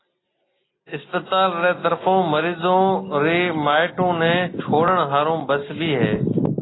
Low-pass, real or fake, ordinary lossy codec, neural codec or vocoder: 7.2 kHz; real; AAC, 16 kbps; none